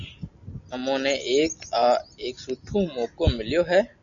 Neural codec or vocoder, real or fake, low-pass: none; real; 7.2 kHz